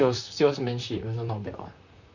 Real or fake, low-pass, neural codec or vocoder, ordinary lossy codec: fake; 7.2 kHz; vocoder, 44.1 kHz, 128 mel bands, Pupu-Vocoder; none